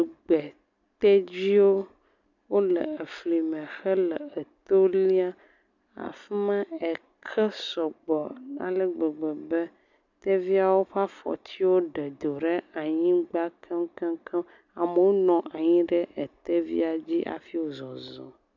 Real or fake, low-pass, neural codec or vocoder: real; 7.2 kHz; none